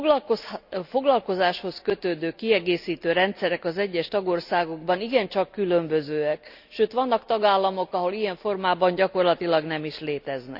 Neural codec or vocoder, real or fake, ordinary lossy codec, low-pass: none; real; none; 5.4 kHz